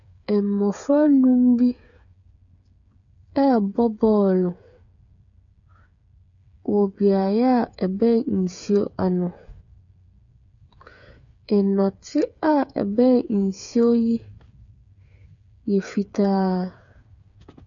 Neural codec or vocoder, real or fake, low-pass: codec, 16 kHz, 8 kbps, FreqCodec, smaller model; fake; 7.2 kHz